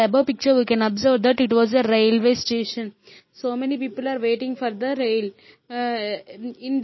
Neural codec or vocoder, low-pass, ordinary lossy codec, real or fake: vocoder, 44.1 kHz, 128 mel bands every 256 samples, BigVGAN v2; 7.2 kHz; MP3, 24 kbps; fake